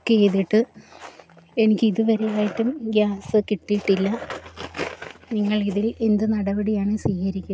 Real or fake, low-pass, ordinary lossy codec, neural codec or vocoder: real; none; none; none